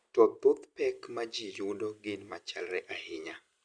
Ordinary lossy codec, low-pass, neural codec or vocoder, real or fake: Opus, 64 kbps; 9.9 kHz; none; real